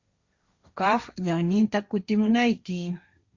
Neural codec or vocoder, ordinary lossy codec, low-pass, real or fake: codec, 16 kHz, 1.1 kbps, Voila-Tokenizer; Opus, 64 kbps; 7.2 kHz; fake